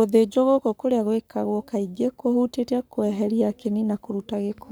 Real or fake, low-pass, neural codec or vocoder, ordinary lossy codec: fake; none; codec, 44.1 kHz, 7.8 kbps, Pupu-Codec; none